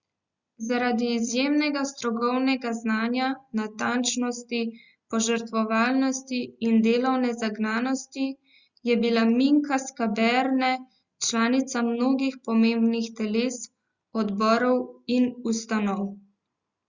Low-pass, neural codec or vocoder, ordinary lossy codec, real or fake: 7.2 kHz; none; Opus, 64 kbps; real